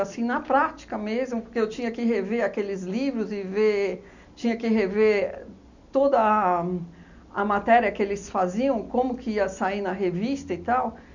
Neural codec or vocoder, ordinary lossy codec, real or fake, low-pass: none; none; real; 7.2 kHz